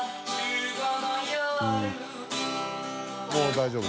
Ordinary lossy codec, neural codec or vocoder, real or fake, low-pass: none; none; real; none